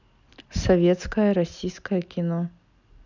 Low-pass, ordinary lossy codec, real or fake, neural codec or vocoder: 7.2 kHz; none; real; none